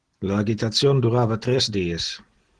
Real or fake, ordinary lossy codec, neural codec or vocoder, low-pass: real; Opus, 16 kbps; none; 9.9 kHz